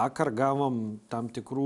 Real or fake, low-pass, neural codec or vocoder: real; 10.8 kHz; none